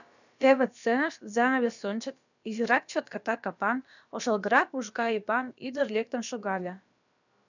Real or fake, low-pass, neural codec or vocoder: fake; 7.2 kHz; codec, 16 kHz, about 1 kbps, DyCAST, with the encoder's durations